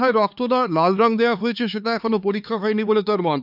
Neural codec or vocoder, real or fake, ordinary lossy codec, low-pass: codec, 16 kHz, 4 kbps, X-Codec, HuBERT features, trained on LibriSpeech; fake; none; 5.4 kHz